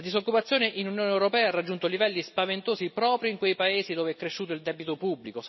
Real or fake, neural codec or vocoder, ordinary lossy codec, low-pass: real; none; MP3, 24 kbps; 7.2 kHz